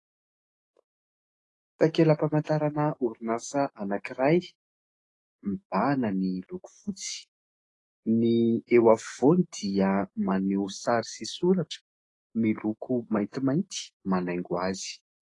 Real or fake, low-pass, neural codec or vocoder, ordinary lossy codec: fake; 10.8 kHz; autoencoder, 48 kHz, 128 numbers a frame, DAC-VAE, trained on Japanese speech; AAC, 32 kbps